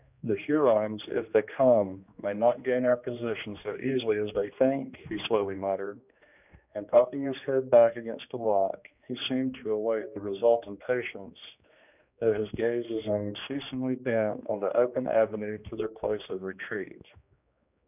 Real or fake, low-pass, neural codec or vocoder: fake; 3.6 kHz; codec, 16 kHz, 2 kbps, X-Codec, HuBERT features, trained on general audio